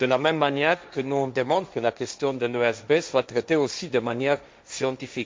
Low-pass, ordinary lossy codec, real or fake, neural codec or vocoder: none; none; fake; codec, 16 kHz, 1.1 kbps, Voila-Tokenizer